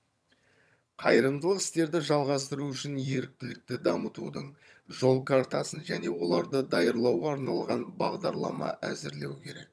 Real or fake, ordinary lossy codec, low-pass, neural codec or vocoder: fake; none; none; vocoder, 22.05 kHz, 80 mel bands, HiFi-GAN